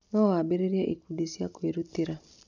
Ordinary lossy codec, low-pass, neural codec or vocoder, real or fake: none; 7.2 kHz; none; real